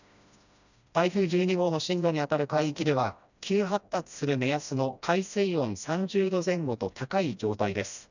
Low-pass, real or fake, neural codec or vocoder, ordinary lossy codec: 7.2 kHz; fake; codec, 16 kHz, 1 kbps, FreqCodec, smaller model; none